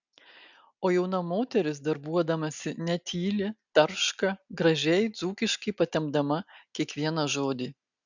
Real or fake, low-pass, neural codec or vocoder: real; 7.2 kHz; none